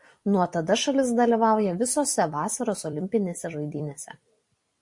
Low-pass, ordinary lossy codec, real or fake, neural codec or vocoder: 10.8 kHz; MP3, 48 kbps; fake; vocoder, 44.1 kHz, 128 mel bands every 256 samples, BigVGAN v2